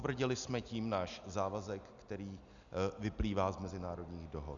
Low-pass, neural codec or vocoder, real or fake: 7.2 kHz; none; real